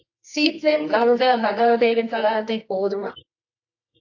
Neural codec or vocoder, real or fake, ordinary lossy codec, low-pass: codec, 24 kHz, 0.9 kbps, WavTokenizer, medium music audio release; fake; AAC, 48 kbps; 7.2 kHz